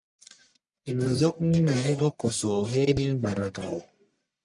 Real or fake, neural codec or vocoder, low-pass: fake; codec, 44.1 kHz, 1.7 kbps, Pupu-Codec; 10.8 kHz